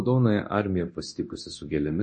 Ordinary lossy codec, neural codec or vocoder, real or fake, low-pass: MP3, 32 kbps; codec, 24 kHz, 0.9 kbps, DualCodec; fake; 10.8 kHz